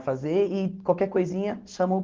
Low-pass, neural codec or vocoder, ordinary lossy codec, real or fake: 7.2 kHz; none; Opus, 16 kbps; real